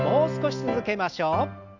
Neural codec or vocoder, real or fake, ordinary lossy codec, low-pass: none; real; none; 7.2 kHz